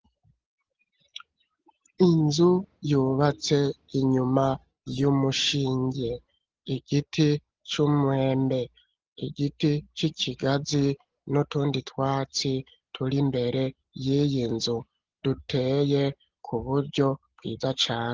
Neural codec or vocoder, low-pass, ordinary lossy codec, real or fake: none; 7.2 kHz; Opus, 16 kbps; real